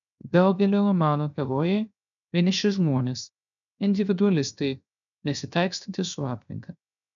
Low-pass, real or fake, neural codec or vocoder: 7.2 kHz; fake; codec, 16 kHz, 0.7 kbps, FocalCodec